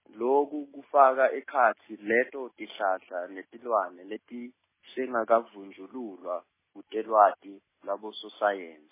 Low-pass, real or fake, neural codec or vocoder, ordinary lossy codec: 3.6 kHz; real; none; MP3, 16 kbps